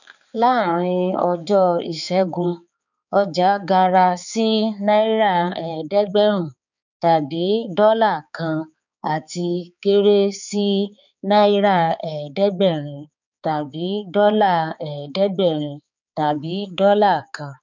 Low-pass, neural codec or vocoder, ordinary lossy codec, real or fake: 7.2 kHz; codec, 16 kHz, 4 kbps, X-Codec, HuBERT features, trained on balanced general audio; none; fake